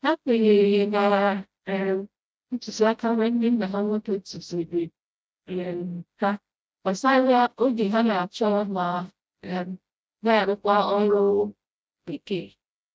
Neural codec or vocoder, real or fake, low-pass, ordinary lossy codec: codec, 16 kHz, 0.5 kbps, FreqCodec, smaller model; fake; none; none